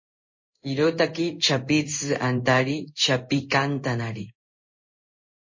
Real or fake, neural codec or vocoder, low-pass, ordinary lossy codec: fake; codec, 16 kHz in and 24 kHz out, 1 kbps, XY-Tokenizer; 7.2 kHz; MP3, 32 kbps